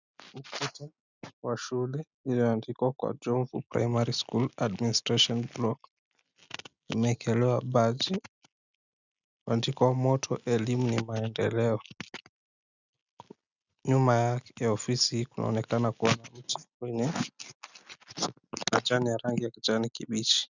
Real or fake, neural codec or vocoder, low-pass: real; none; 7.2 kHz